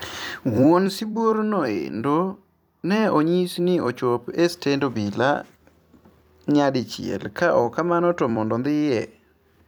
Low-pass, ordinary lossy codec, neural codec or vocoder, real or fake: none; none; vocoder, 44.1 kHz, 128 mel bands every 256 samples, BigVGAN v2; fake